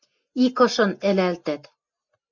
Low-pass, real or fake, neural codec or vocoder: 7.2 kHz; real; none